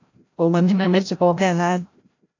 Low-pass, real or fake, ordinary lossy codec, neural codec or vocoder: 7.2 kHz; fake; AAC, 48 kbps; codec, 16 kHz, 0.5 kbps, FreqCodec, larger model